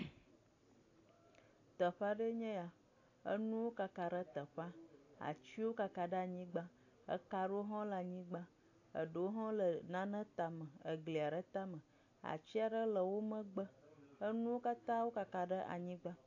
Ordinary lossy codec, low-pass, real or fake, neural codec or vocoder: AAC, 48 kbps; 7.2 kHz; real; none